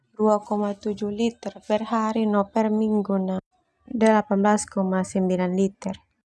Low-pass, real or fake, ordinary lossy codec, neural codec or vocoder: none; real; none; none